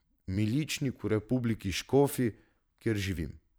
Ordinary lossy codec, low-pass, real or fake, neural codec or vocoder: none; none; fake; vocoder, 44.1 kHz, 128 mel bands every 512 samples, BigVGAN v2